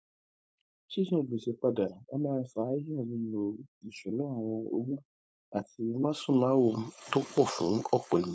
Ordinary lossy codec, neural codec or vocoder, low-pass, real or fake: none; codec, 16 kHz, 4.8 kbps, FACodec; none; fake